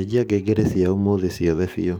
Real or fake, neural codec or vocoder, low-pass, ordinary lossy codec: fake; codec, 44.1 kHz, 7.8 kbps, DAC; none; none